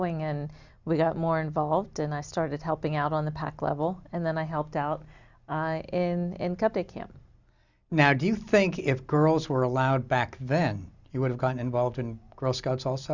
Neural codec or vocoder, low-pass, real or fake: none; 7.2 kHz; real